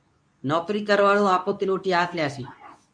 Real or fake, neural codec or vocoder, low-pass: fake; codec, 24 kHz, 0.9 kbps, WavTokenizer, medium speech release version 2; 9.9 kHz